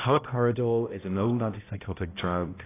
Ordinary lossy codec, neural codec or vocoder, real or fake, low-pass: AAC, 16 kbps; codec, 16 kHz, 0.5 kbps, X-Codec, HuBERT features, trained on balanced general audio; fake; 3.6 kHz